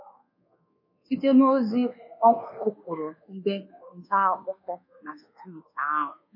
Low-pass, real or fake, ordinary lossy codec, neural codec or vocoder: 5.4 kHz; fake; MP3, 32 kbps; codec, 24 kHz, 1.2 kbps, DualCodec